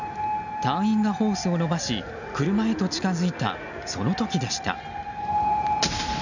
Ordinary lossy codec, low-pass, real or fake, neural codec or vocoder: none; 7.2 kHz; real; none